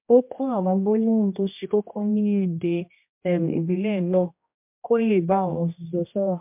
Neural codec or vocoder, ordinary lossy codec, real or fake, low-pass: codec, 16 kHz, 1 kbps, X-Codec, HuBERT features, trained on general audio; MP3, 32 kbps; fake; 3.6 kHz